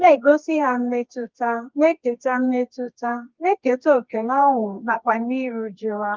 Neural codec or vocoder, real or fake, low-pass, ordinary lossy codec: codec, 24 kHz, 0.9 kbps, WavTokenizer, medium music audio release; fake; 7.2 kHz; Opus, 32 kbps